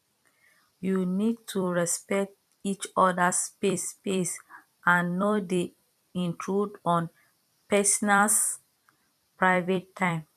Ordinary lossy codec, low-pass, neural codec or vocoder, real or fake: none; 14.4 kHz; vocoder, 44.1 kHz, 128 mel bands every 256 samples, BigVGAN v2; fake